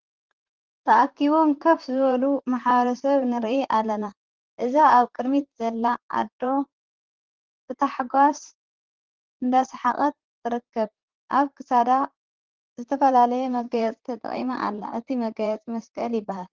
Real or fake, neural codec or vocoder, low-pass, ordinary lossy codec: fake; vocoder, 44.1 kHz, 128 mel bands, Pupu-Vocoder; 7.2 kHz; Opus, 16 kbps